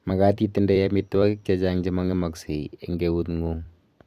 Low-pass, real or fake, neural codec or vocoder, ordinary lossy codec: 14.4 kHz; fake; vocoder, 44.1 kHz, 128 mel bands every 256 samples, BigVGAN v2; none